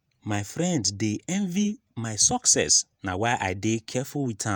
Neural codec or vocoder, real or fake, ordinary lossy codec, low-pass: vocoder, 48 kHz, 128 mel bands, Vocos; fake; none; none